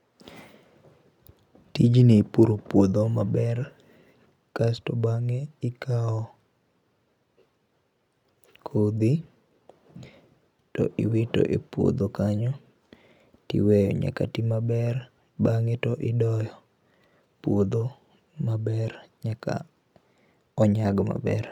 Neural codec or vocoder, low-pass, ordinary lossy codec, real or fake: none; 19.8 kHz; none; real